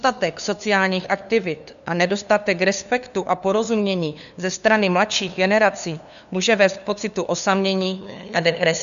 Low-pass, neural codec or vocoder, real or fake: 7.2 kHz; codec, 16 kHz, 2 kbps, FunCodec, trained on LibriTTS, 25 frames a second; fake